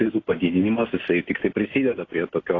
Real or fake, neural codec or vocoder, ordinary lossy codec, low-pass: real; none; AAC, 32 kbps; 7.2 kHz